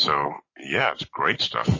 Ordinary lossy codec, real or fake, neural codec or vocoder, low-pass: MP3, 32 kbps; real; none; 7.2 kHz